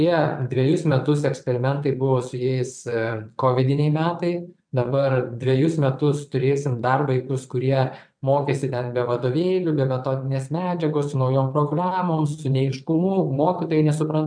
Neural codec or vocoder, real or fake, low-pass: vocoder, 22.05 kHz, 80 mel bands, WaveNeXt; fake; 9.9 kHz